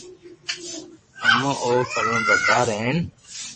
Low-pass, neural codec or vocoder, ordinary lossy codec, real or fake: 9.9 kHz; vocoder, 22.05 kHz, 80 mel bands, WaveNeXt; MP3, 32 kbps; fake